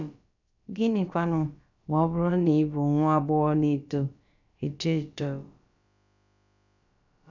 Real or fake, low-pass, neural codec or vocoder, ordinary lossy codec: fake; 7.2 kHz; codec, 16 kHz, about 1 kbps, DyCAST, with the encoder's durations; none